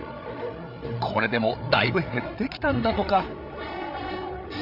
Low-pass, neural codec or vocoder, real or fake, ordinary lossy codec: 5.4 kHz; codec, 16 kHz, 8 kbps, FreqCodec, larger model; fake; AAC, 48 kbps